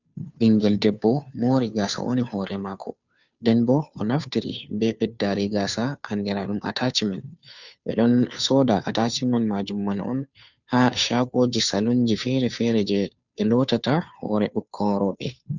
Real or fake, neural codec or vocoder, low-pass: fake; codec, 16 kHz, 2 kbps, FunCodec, trained on Chinese and English, 25 frames a second; 7.2 kHz